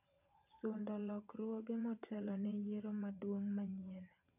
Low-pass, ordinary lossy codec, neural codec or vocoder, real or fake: 3.6 kHz; none; vocoder, 22.05 kHz, 80 mel bands, WaveNeXt; fake